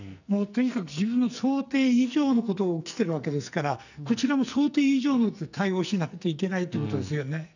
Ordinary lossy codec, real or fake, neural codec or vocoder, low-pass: none; fake; codec, 44.1 kHz, 2.6 kbps, SNAC; 7.2 kHz